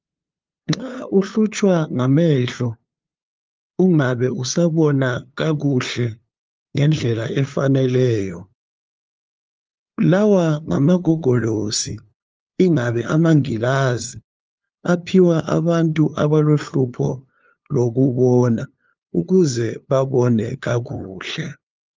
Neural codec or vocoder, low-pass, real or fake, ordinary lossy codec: codec, 16 kHz, 2 kbps, FunCodec, trained on LibriTTS, 25 frames a second; 7.2 kHz; fake; Opus, 24 kbps